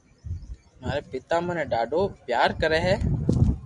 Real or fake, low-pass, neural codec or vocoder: real; 10.8 kHz; none